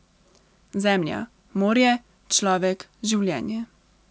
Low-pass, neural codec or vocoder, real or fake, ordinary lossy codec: none; none; real; none